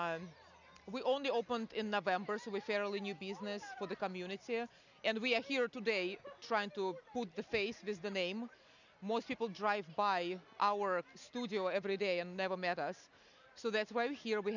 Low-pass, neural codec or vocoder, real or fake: 7.2 kHz; none; real